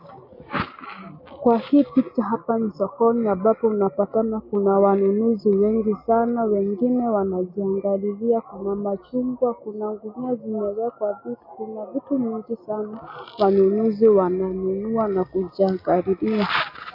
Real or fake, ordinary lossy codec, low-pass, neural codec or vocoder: real; MP3, 32 kbps; 5.4 kHz; none